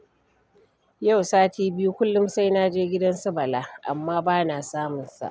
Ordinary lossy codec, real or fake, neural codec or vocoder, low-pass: none; real; none; none